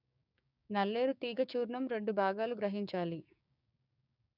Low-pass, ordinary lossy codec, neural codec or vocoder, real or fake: 5.4 kHz; none; codec, 16 kHz, 6 kbps, DAC; fake